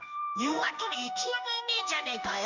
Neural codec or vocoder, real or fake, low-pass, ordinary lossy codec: codec, 16 kHz in and 24 kHz out, 1 kbps, XY-Tokenizer; fake; 7.2 kHz; none